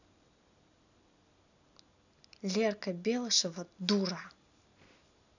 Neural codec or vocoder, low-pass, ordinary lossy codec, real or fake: none; 7.2 kHz; none; real